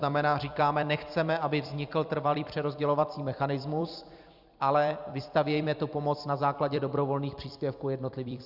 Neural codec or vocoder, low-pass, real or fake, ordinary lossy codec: vocoder, 44.1 kHz, 128 mel bands every 256 samples, BigVGAN v2; 5.4 kHz; fake; Opus, 64 kbps